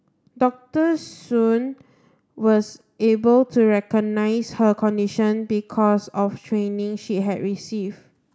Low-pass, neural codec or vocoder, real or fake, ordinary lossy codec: none; none; real; none